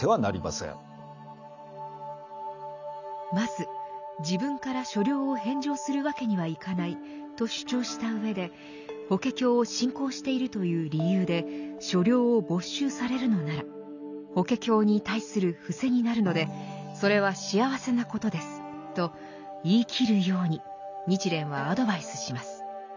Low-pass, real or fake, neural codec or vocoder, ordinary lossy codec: 7.2 kHz; real; none; none